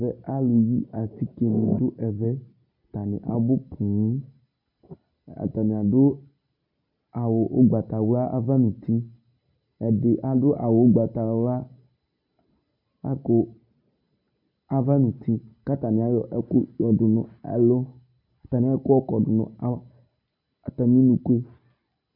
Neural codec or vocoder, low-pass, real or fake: none; 5.4 kHz; real